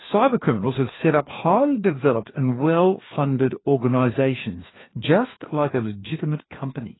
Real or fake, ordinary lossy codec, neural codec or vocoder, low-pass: fake; AAC, 16 kbps; codec, 16 kHz, 2 kbps, FreqCodec, larger model; 7.2 kHz